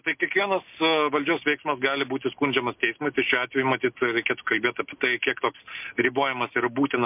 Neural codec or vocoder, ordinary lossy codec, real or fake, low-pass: none; MP3, 32 kbps; real; 3.6 kHz